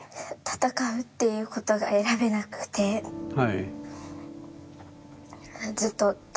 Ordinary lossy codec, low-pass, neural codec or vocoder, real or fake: none; none; none; real